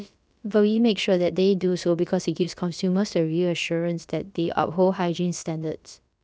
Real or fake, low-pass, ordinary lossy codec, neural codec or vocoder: fake; none; none; codec, 16 kHz, about 1 kbps, DyCAST, with the encoder's durations